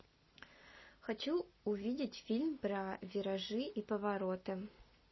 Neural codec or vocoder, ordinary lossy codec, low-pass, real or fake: none; MP3, 24 kbps; 7.2 kHz; real